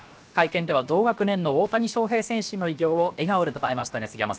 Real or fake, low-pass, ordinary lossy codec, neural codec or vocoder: fake; none; none; codec, 16 kHz, 0.7 kbps, FocalCodec